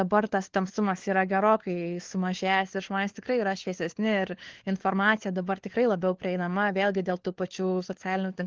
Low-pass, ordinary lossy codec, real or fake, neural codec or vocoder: 7.2 kHz; Opus, 16 kbps; fake; codec, 16 kHz, 4 kbps, FunCodec, trained on LibriTTS, 50 frames a second